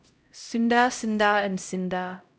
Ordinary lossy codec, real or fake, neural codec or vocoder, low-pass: none; fake; codec, 16 kHz, 0.5 kbps, X-Codec, HuBERT features, trained on LibriSpeech; none